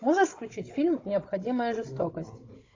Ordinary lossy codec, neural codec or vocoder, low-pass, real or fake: AAC, 32 kbps; codec, 16 kHz, 16 kbps, FunCodec, trained on Chinese and English, 50 frames a second; 7.2 kHz; fake